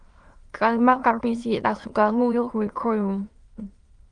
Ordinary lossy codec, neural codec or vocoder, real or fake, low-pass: Opus, 24 kbps; autoencoder, 22.05 kHz, a latent of 192 numbers a frame, VITS, trained on many speakers; fake; 9.9 kHz